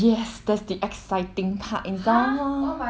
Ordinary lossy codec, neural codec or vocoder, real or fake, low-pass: none; none; real; none